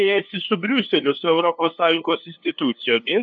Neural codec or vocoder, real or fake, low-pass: codec, 16 kHz, 2 kbps, FunCodec, trained on LibriTTS, 25 frames a second; fake; 7.2 kHz